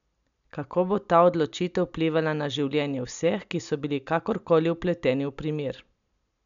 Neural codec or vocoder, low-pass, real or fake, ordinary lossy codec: none; 7.2 kHz; real; MP3, 96 kbps